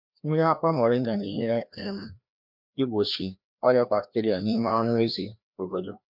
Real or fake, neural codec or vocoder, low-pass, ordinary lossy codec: fake; codec, 16 kHz, 1 kbps, FreqCodec, larger model; 5.4 kHz; MP3, 48 kbps